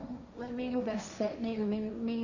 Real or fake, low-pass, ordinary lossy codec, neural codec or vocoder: fake; 7.2 kHz; none; codec, 16 kHz, 1.1 kbps, Voila-Tokenizer